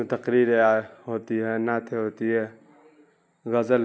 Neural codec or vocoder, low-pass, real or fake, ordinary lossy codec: none; none; real; none